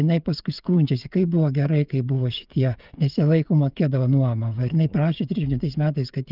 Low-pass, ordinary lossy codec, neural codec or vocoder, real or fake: 5.4 kHz; Opus, 24 kbps; codec, 16 kHz, 16 kbps, FreqCodec, smaller model; fake